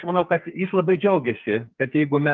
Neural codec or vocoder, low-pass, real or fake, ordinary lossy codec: codec, 44.1 kHz, 2.6 kbps, SNAC; 7.2 kHz; fake; Opus, 32 kbps